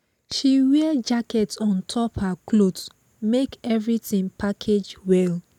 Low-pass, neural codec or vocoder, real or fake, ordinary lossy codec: 19.8 kHz; none; real; none